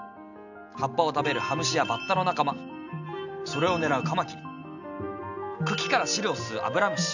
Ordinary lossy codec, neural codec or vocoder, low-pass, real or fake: none; none; 7.2 kHz; real